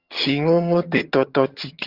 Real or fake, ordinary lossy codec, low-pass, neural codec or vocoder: fake; Opus, 32 kbps; 5.4 kHz; vocoder, 22.05 kHz, 80 mel bands, HiFi-GAN